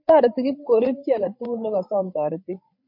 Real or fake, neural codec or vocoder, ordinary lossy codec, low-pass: fake; codec, 16 kHz, 8 kbps, FreqCodec, larger model; MP3, 32 kbps; 5.4 kHz